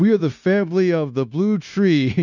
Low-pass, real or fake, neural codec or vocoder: 7.2 kHz; fake; codec, 16 kHz, 0.9 kbps, LongCat-Audio-Codec